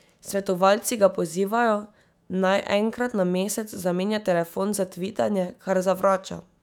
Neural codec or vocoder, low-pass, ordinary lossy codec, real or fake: autoencoder, 48 kHz, 128 numbers a frame, DAC-VAE, trained on Japanese speech; 19.8 kHz; none; fake